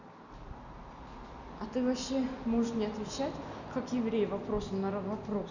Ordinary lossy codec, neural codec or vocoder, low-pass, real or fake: none; none; 7.2 kHz; real